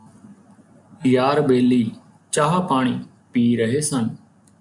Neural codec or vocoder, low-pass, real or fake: none; 10.8 kHz; real